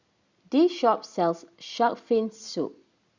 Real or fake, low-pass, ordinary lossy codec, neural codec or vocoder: real; 7.2 kHz; Opus, 64 kbps; none